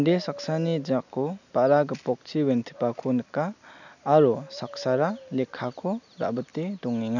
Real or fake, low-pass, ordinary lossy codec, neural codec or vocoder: real; 7.2 kHz; none; none